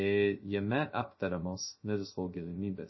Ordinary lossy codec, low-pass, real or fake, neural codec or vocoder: MP3, 24 kbps; 7.2 kHz; fake; codec, 16 kHz, 0.2 kbps, FocalCodec